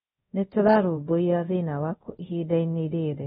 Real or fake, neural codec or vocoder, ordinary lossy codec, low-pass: fake; codec, 24 kHz, 0.5 kbps, DualCodec; AAC, 16 kbps; 10.8 kHz